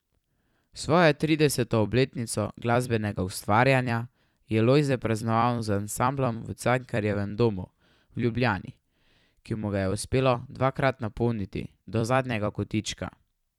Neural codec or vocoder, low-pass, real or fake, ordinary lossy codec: vocoder, 44.1 kHz, 128 mel bands every 256 samples, BigVGAN v2; 19.8 kHz; fake; none